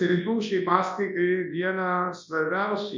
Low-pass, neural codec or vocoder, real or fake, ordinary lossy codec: 7.2 kHz; codec, 24 kHz, 0.9 kbps, WavTokenizer, large speech release; fake; MP3, 64 kbps